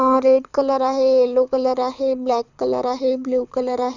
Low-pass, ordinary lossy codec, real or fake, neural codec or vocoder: 7.2 kHz; none; fake; codec, 24 kHz, 6 kbps, HILCodec